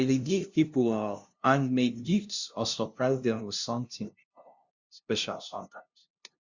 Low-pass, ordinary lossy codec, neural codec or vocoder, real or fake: 7.2 kHz; Opus, 64 kbps; codec, 16 kHz, 0.5 kbps, FunCodec, trained on LibriTTS, 25 frames a second; fake